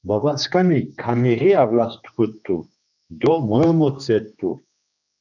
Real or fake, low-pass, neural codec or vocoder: fake; 7.2 kHz; codec, 16 kHz, 2 kbps, X-Codec, HuBERT features, trained on general audio